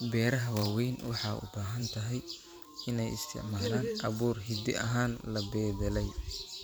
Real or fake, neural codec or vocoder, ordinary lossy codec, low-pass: fake; vocoder, 44.1 kHz, 128 mel bands every 512 samples, BigVGAN v2; none; none